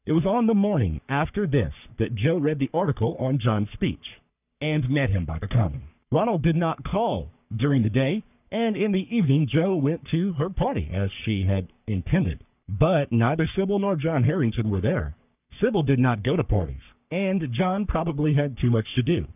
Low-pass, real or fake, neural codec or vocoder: 3.6 kHz; fake; codec, 44.1 kHz, 3.4 kbps, Pupu-Codec